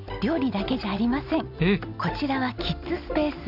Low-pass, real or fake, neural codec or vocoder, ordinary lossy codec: 5.4 kHz; real; none; none